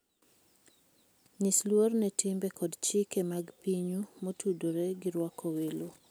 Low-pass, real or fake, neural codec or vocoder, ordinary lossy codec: none; real; none; none